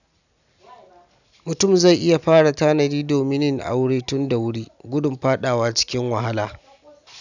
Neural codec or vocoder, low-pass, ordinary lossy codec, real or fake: none; 7.2 kHz; none; real